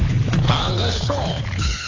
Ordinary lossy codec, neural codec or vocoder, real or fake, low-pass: AAC, 32 kbps; codec, 24 kHz, 6 kbps, HILCodec; fake; 7.2 kHz